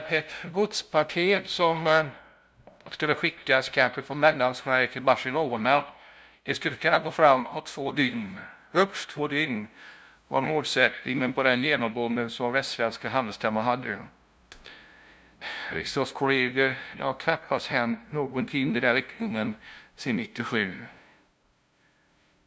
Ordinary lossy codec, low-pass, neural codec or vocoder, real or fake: none; none; codec, 16 kHz, 0.5 kbps, FunCodec, trained on LibriTTS, 25 frames a second; fake